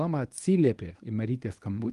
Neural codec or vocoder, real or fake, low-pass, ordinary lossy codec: codec, 24 kHz, 0.9 kbps, WavTokenizer, medium speech release version 1; fake; 10.8 kHz; Opus, 24 kbps